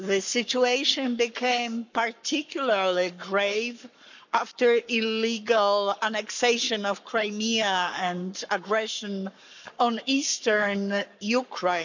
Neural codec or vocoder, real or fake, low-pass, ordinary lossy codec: codec, 44.1 kHz, 7.8 kbps, Pupu-Codec; fake; 7.2 kHz; none